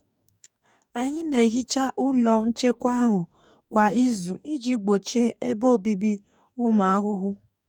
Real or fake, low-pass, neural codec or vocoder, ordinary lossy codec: fake; 19.8 kHz; codec, 44.1 kHz, 2.6 kbps, DAC; none